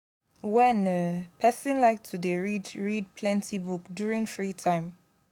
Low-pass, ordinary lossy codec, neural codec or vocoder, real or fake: 19.8 kHz; none; codec, 44.1 kHz, 7.8 kbps, DAC; fake